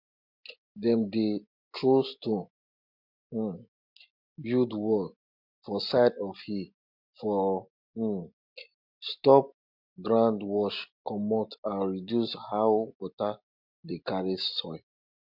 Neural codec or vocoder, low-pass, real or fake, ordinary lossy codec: none; 5.4 kHz; real; MP3, 48 kbps